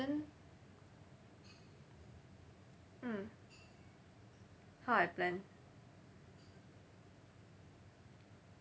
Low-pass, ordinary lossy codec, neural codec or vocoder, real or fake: none; none; none; real